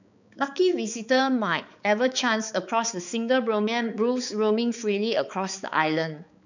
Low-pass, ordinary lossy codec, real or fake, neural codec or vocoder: 7.2 kHz; none; fake; codec, 16 kHz, 4 kbps, X-Codec, HuBERT features, trained on balanced general audio